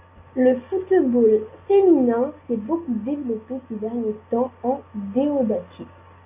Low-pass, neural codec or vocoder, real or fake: 3.6 kHz; none; real